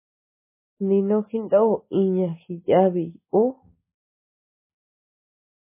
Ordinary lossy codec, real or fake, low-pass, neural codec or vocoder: MP3, 16 kbps; real; 3.6 kHz; none